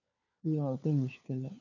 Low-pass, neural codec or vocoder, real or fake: 7.2 kHz; codec, 16 kHz, 4 kbps, FunCodec, trained on LibriTTS, 50 frames a second; fake